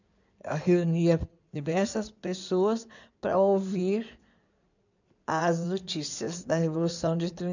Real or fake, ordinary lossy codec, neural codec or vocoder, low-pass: fake; none; codec, 16 kHz in and 24 kHz out, 2.2 kbps, FireRedTTS-2 codec; 7.2 kHz